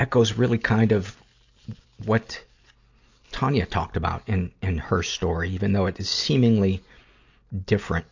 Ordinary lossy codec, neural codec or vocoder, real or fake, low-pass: AAC, 48 kbps; none; real; 7.2 kHz